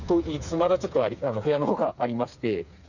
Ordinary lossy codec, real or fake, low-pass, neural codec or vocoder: none; fake; 7.2 kHz; codec, 16 kHz, 4 kbps, FreqCodec, smaller model